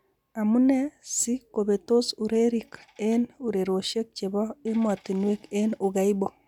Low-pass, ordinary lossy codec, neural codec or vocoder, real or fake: 19.8 kHz; none; none; real